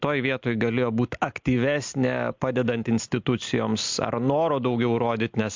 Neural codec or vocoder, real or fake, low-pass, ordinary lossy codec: none; real; 7.2 kHz; MP3, 64 kbps